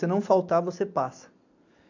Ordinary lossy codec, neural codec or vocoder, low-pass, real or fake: MP3, 64 kbps; none; 7.2 kHz; real